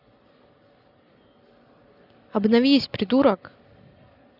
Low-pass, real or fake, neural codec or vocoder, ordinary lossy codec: 5.4 kHz; real; none; none